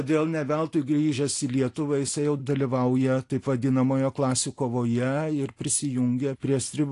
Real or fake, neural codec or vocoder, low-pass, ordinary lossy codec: real; none; 10.8 kHz; AAC, 48 kbps